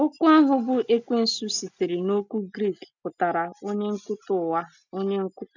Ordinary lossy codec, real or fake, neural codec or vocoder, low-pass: none; real; none; 7.2 kHz